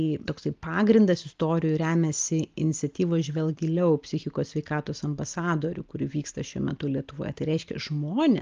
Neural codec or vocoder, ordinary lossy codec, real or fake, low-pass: none; Opus, 32 kbps; real; 7.2 kHz